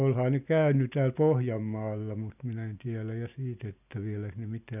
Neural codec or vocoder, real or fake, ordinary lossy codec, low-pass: none; real; none; 3.6 kHz